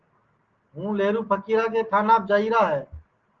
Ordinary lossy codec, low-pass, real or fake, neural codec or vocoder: Opus, 16 kbps; 7.2 kHz; real; none